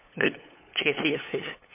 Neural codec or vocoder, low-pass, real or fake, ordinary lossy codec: codec, 16 kHz, 8 kbps, FunCodec, trained on LibriTTS, 25 frames a second; 3.6 kHz; fake; MP3, 24 kbps